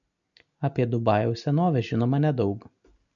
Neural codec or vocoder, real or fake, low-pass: none; real; 7.2 kHz